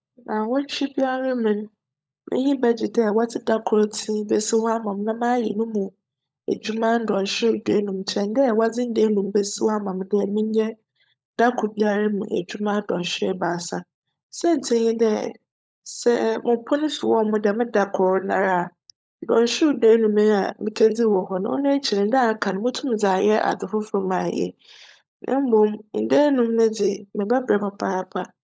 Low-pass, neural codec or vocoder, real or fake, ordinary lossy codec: none; codec, 16 kHz, 16 kbps, FunCodec, trained on LibriTTS, 50 frames a second; fake; none